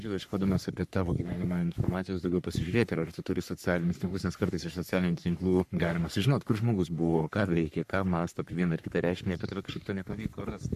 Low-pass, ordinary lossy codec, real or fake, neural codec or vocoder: 14.4 kHz; MP3, 96 kbps; fake; codec, 44.1 kHz, 3.4 kbps, Pupu-Codec